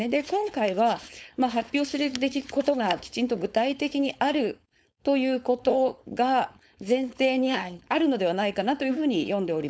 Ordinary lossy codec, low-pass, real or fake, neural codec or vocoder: none; none; fake; codec, 16 kHz, 4.8 kbps, FACodec